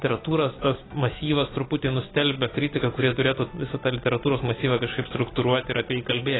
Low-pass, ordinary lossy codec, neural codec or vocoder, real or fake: 7.2 kHz; AAC, 16 kbps; none; real